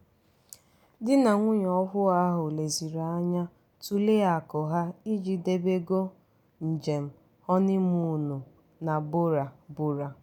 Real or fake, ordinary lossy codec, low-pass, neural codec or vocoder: real; none; 19.8 kHz; none